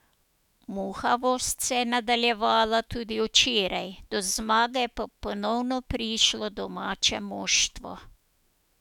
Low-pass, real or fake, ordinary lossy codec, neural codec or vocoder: 19.8 kHz; fake; none; autoencoder, 48 kHz, 128 numbers a frame, DAC-VAE, trained on Japanese speech